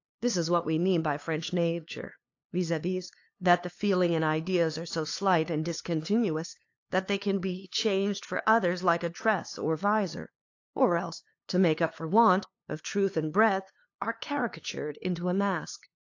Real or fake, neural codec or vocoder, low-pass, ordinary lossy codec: fake; codec, 16 kHz, 2 kbps, FunCodec, trained on LibriTTS, 25 frames a second; 7.2 kHz; AAC, 48 kbps